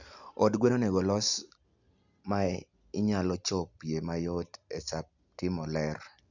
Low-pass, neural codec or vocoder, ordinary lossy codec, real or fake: 7.2 kHz; none; none; real